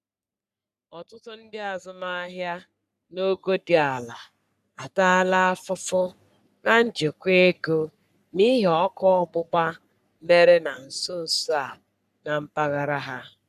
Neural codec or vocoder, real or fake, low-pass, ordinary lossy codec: codec, 44.1 kHz, 3.4 kbps, Pupu-Codec; fake; 14.4 kHz; none